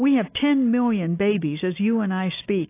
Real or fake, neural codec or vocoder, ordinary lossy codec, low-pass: real; none; AAC, 24 kbps; 3.6 kHz